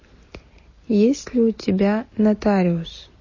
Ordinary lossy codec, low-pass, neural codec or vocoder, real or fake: MP3, 32 kbps; 7.2 kHz; none; real